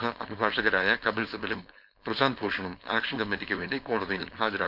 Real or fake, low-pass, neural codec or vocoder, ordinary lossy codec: fake; 5.4 kHz; codec, 16 kHz, 4.8 kbps, FACodec; none